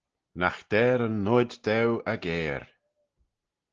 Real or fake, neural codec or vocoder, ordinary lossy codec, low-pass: real; none; Opus, 16 kbps; 7.2 kHz